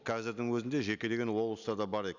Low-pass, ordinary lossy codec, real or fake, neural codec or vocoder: 7.2 kHz; none; real; none